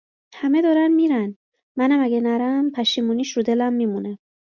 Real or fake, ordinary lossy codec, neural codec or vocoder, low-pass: real; MP3, 64 kbps; none; 7.2 kHz